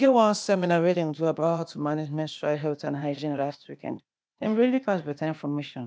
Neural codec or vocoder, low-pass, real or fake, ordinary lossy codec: codec, 16 kHz, 0.8 kbps, ZipCodec; none; fake; none